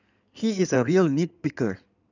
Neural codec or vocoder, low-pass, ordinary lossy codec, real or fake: codec, 16 kHz in and 24 kHz out, 2.2 kbps, FireRedTTS-2 codec; 7.2 kHz; none; fake